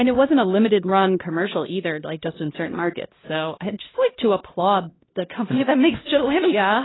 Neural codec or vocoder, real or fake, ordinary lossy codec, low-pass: codec, 16 kHz, 1 kbps, X-Codec, HuBERT features, trained on LibriSpeech; fake; AAC, 16 kbps; 7.2 kHz